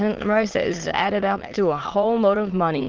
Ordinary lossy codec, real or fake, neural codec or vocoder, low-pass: Opus, 16 kbps; fake; autoencoder, 22.05 kHz, a latent of 192 numbers a frame, VITS, trained on many speakers; 7.2 kHz